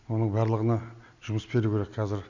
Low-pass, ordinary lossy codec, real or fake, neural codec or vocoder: 7.2 kHz; Opus, 64 kbps; real; none